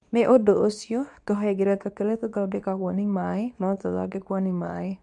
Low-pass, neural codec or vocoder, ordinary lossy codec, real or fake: 10.8 kHz; codec, 24 kHz, 0.9 kbps, WavTokenizer, medium speech release version 2; none; fake